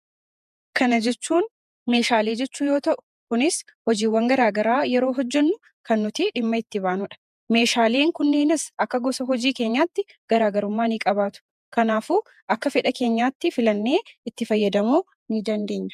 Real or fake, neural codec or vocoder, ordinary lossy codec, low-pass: fake; vocoder, 48 kHz, 128 mel bands, Vocos; MP3, 96 kbps; 14.4 kHz